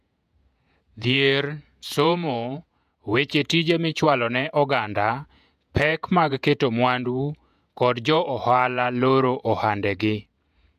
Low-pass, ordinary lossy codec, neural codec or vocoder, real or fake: 14.4 kHz; MP3, 96 kbps; vocoder, 48 kHz, 128 mel bands, Vocos; fake